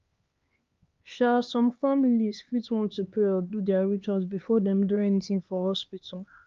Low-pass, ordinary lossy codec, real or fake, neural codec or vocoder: 7.2 kHz; Opus, 32 kbps; fake; codec, 16 kHz, 2 kbps, X-Codec, HuBERT features, trained on LibriSpeech